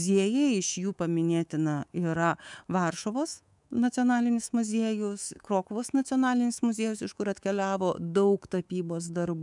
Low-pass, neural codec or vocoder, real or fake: 10.8 kHz; codec, 24 kHz, 3.1 kbps, DualCodec; fake